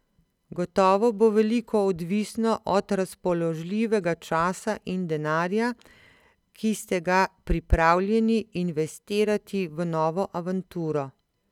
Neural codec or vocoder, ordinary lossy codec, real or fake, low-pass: none; none; real; 19.8 kHz